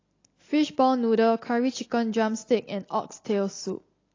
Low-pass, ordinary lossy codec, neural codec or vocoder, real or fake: 7.2 kHz; AAC, 32 kbps; none; real